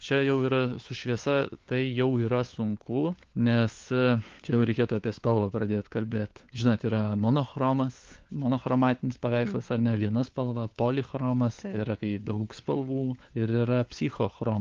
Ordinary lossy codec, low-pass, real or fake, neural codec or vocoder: Opus, 32 kbps; 7.2 kHz; fake; codec, 16 kHz, 4 kbps, FunCodec, trained on LibriTTS, 50 frames a second